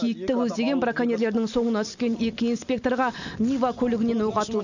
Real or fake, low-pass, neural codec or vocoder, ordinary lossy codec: real; 7.2 kHz; none; none